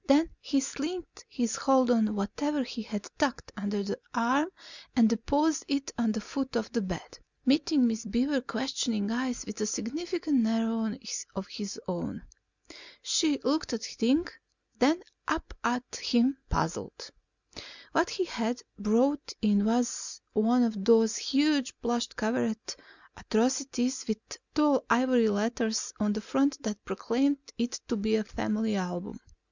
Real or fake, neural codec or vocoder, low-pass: real; none; 7.2 kHz